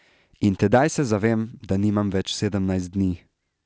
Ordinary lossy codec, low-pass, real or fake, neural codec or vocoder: none; none; real; none